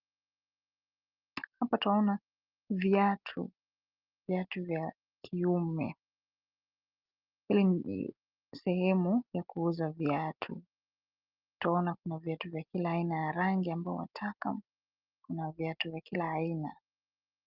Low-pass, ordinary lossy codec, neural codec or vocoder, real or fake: 5.4 kHz; Opus, 32 kbps; none; real